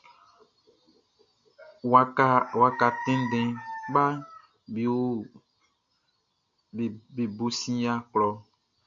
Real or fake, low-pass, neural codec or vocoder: real; 7.2 kHz; none